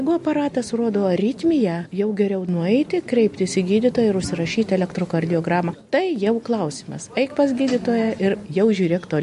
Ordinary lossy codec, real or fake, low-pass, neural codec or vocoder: MP3, 48 kbps; real; 14.4 kHz; none